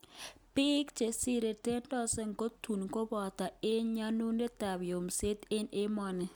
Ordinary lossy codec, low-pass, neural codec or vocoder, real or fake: none; none; none; real